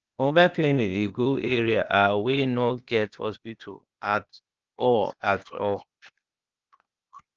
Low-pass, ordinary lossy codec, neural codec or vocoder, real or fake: 7.2 kHz; Opus, 24 kbps; codec, 16 kHz, 0.8 kbps, ZipCodec; fake